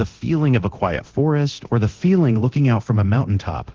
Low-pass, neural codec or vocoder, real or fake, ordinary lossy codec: 7.2 kHz; codec, 24 kHz, 0.9 kbps, DualCodec; fake; Opus, 16 kbps